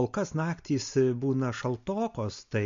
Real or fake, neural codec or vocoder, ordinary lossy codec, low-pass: real; none; MP3, 48 kbps; 7.2 kHz